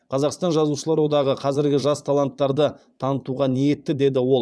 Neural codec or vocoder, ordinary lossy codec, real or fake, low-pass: vocoder, 22.05 kHz, 80 mel bands, Vocos; none; fake; none